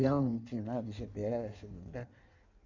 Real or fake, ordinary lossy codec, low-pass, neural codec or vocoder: fake; none; 7.2 kHz; codec, 16 kHz in and 24 kHz out, 1.1 kbps, FireRedTTS-2 codec